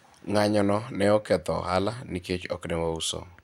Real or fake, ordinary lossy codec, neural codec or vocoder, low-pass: fake; none; vocoder, 44.1 kHz, 128 mel bands every 512 samples, BigVGAN v2; 19.8 kHz